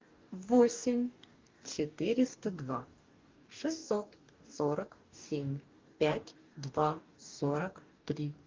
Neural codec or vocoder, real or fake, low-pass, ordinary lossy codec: codec, 44.1 kHz, 2.6 kbps, DAC; fake; 7.2 kHz; Opus, 32 kbps